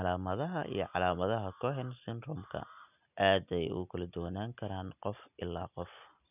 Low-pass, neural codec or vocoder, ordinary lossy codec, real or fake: 3.6 kHz; none; none; real